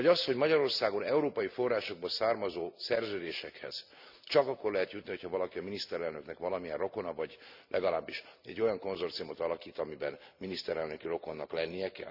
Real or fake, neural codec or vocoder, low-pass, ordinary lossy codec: real; none; 5.4 kHz; none